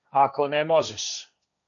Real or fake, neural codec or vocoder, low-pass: fake; codec, 16 kHz, 1.1 kbps, Voila-Tokenizer; 7.2 kHz